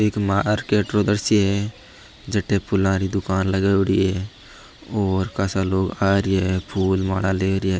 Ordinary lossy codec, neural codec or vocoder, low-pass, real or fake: none; none; none; real